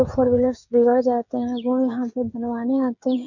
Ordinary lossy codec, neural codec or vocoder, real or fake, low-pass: none; codec, 16 kHz, 8 kbps, FreqCodec, smaller model; fake; 7.2 kHz